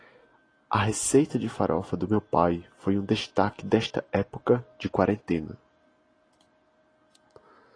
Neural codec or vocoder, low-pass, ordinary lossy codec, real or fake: none; 9.9 kHz; AAC, 32 kbps; real